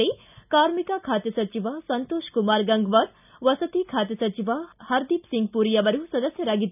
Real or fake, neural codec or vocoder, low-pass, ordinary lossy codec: real; none; 3.6 kHz; none